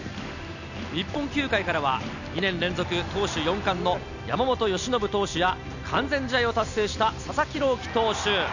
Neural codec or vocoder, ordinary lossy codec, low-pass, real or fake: none; none; 7.2 kHz; real